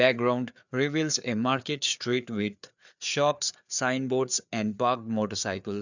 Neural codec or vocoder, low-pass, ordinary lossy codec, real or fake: codec, 16 kHz, 4 kbps, FreqCodec, larger model; 7.2 kHz; none; fake